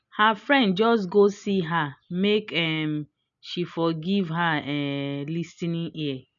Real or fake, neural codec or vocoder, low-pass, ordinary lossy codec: real; none; 7.2 kHz; none